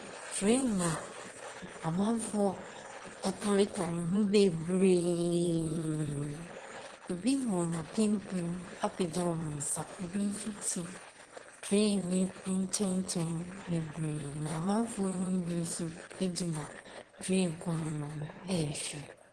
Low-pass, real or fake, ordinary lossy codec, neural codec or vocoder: 9.9 kHz; fake; Opus, 16 kbps; autoencoder, 22.05 kHz, a latent of 192 numbers a frame, VITS, trained on one speaker